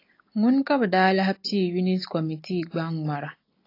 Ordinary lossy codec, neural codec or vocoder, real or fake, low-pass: AAC, 32 kbps; none; real; 5.4 kHz